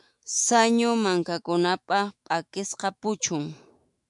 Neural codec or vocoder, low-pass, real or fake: autoencoder, 48 kHz, 128 numbers a frame, DAC-VAE, trained on Japanese speech; 10.8 kHz; fake